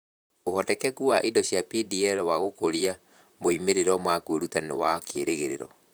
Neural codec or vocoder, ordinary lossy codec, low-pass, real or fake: vocoder, 44.1 kHz, 128 mel bands, Pupu-Vocoder; none; none; fake